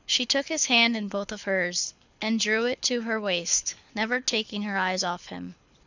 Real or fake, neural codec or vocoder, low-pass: fake; codec, 24 kHz, 6 kbps, HILCodec; 7.2 kHz